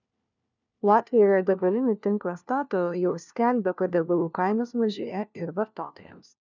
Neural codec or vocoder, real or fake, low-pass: codec, 16 kHz, 1 kbps, FunCodec, trained on LibriTTS, 50 frames a second; fake; 7.2 kHz